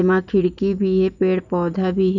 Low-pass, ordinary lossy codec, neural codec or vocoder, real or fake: 7.2 kHz; none; none; real